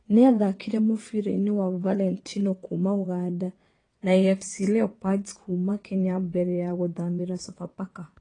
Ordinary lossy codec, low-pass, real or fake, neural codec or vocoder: AAC, 32 kbps; 9.9 kHz; fake; vocoder, 22.05 kHz, 80 mel bands, WaveNeXt